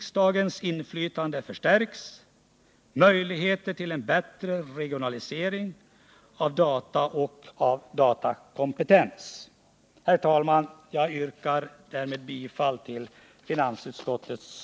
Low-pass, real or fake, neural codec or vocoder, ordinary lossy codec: none; real; none; none